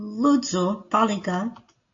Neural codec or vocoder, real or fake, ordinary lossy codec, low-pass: none; real; AAC, 48 kbps; 7.2 kHz